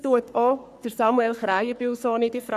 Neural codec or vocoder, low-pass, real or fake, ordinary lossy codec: codec, 44.1 kHz, 3.4 kbps, Pupu-Codec; 14.4 kHz; fake; none